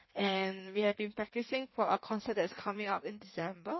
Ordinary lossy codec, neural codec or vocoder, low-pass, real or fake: MP3, 24 kbps; codec, 16 kHz in and 24 kHz out, 1.1 kbps, FireRedTTS-2 codec; 7.2 kHz; fake